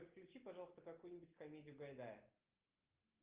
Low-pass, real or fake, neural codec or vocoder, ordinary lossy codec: 3.6 kHz; real; none; Opus, 32 kbps